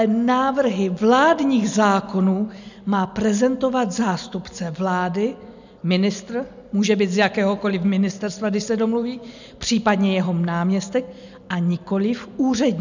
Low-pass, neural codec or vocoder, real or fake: 7.2 kHz; none; real